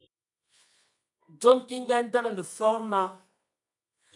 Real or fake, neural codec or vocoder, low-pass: fake; codec, 24 kHz, 0.9 kbps, WavTokenizer, medium music audio release; 10.8 kHz